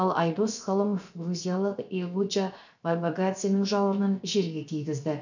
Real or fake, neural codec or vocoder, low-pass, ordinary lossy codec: fake; codec, 16 kHz, 0.7 kbps, FocalCodec; 7.2 kHz; none